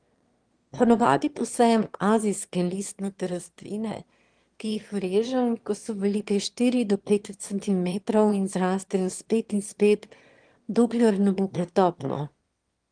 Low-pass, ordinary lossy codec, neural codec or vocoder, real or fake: 9.9 kHz; Opus, 24 kbps; autoencoder, 22.05 kHz, a latent of 192 numbers a frame, VITS, trained on one speaker; fake